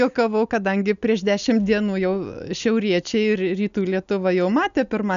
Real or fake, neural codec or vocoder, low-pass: real; none; 7.2 kHz